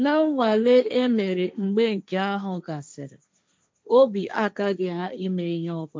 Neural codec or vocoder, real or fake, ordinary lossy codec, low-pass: codec, 16 kHz, 1.1 kbps, Voila-Tokenizer; fake; none; none